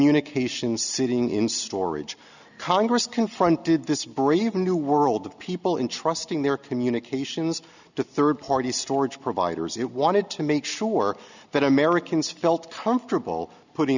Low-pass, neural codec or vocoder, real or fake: 7.2 kHz; none; real